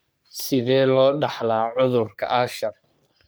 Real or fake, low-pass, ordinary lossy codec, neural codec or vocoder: fake; none; none; codec, 44.1 kHz, 3.4 kbps, Pupu-Codec